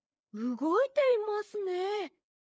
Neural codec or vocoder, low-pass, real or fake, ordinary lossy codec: codec, 16 kHz, 4 kbps, FreqCodec, larger model; none; fake; none